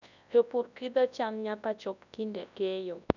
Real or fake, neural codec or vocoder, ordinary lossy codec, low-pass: fake; codec, 24 kHz, 0.9 kbps, WavTokenizer, large speech release; none; 7.2 kHz